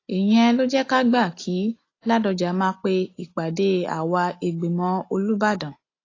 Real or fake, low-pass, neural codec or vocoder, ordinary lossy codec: real; 7.2 kHz; none; AAC, 32 kbps